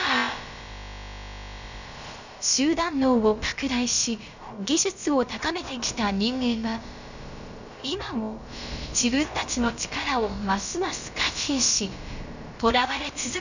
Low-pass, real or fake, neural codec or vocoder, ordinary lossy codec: 7.2 kHz; fake; codec, 16 kHz, about 1 kbps, DyCAST, with the encoder's durations; none